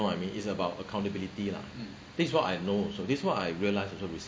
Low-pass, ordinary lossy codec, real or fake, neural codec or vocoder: none; none; real; none